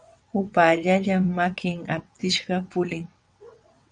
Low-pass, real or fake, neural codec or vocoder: 9.9 kHz; fake; vocoder, 22.05 kHz, 80 mel bands, WaveNeXt